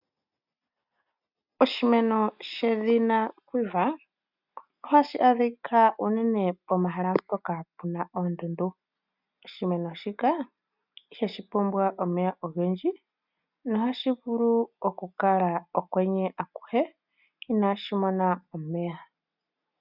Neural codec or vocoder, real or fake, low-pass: none; real; 5.4 kHz